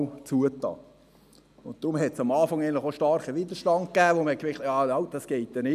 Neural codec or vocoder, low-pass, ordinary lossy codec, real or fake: none; 14.4 kHz; none; real